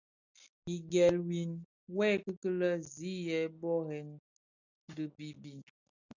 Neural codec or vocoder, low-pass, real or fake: none; 7.2 kHz; real